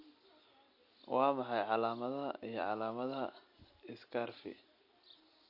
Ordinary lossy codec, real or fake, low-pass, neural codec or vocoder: MP3, 32 kbps; real; 5.4 kHz; none